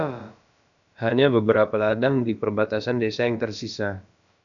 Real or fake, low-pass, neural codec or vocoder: fake; 7.2 kHz; codec, 16 kHz, about 1 kbps, DyCAST, with the encoder's durations